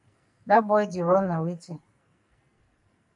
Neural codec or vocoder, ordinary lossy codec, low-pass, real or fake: codec, 44.1 kHz, 2.6 kbps, SNAC; MP3, 64 kbps; 10.8 kHz; fake